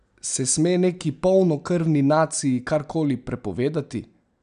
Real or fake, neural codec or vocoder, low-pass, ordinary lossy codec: real; none; 9.9 kHz; none